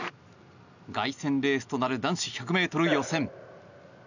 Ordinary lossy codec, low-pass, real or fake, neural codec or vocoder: none; 7.2 kHz; real; none